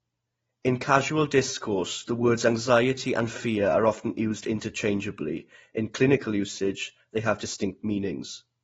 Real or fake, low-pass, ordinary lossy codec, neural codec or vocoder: real; 19.8 kHz; AAC, 24 kbps; none